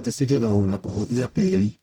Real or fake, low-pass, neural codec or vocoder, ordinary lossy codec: fake; 19.8 kHz; codec, 44.1 kHz, 0.9 kbps, DAC; none